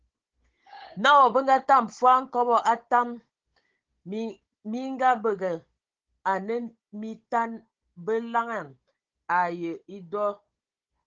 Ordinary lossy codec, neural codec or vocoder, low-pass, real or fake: Opus, 16 kbps; codec, 16 kHz, 16 kbps, FunCodec, trained on Chinese and English, 50 frames a second; 7.2 kHz; fake